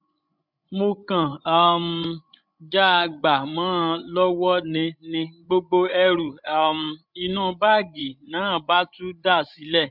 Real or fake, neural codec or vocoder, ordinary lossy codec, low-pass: fake; codec, 16 kHz, 16 kbps, FreqCodec, larger model; none; 5.4 kHz